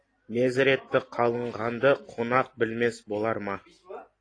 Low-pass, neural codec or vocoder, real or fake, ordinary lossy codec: 9.9 kHz; none; real; AAC, 32 kbps